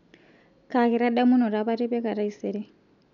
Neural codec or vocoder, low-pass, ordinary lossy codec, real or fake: none; 7.2 kHz; none; real